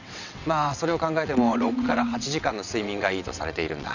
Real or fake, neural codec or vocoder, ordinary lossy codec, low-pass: fake; vocoder, 22.05 kHz, 80 mel bands, WaveNeXt; none; 7.2 kHz